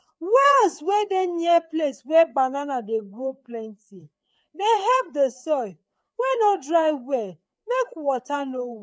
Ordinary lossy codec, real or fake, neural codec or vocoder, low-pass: none; fake; codec, 16 kHz, 4 kbps, FreqCodec, larger model; none